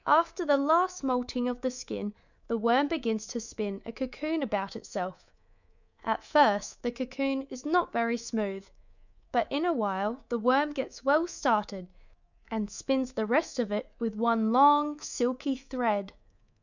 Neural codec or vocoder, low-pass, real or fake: codec, 24 kHz, 3.1 kbps, DualCodec; 7.2 kHz; fake